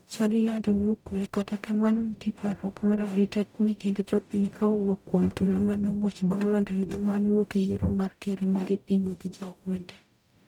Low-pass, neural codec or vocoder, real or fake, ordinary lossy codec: 19.8 kHz; codec, 44.1 kHz, 0.9 kbps, DAC; fake; none